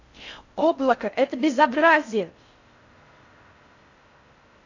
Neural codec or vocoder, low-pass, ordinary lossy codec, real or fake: codec, 16 kHz in and 24 kHz out, 0.6 kbps, FocalCodec, streaming, 2048 codes; 7.2 kHz; AAC, 48 kbps; fake